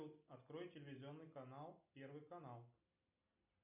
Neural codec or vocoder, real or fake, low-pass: none; real; 3.6 kHz